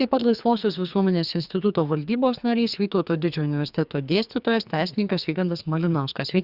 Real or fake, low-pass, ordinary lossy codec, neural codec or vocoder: fake; 5.4 kHz; Opus, 64 kbps; codec, 44.1 kHz, 2.6 kbps, SNAC